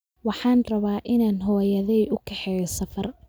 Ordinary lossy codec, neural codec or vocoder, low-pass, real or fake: none; none; none; real